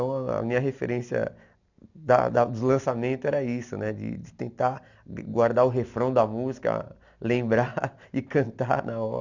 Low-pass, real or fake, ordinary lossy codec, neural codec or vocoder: 7.2 kHz; real; none; none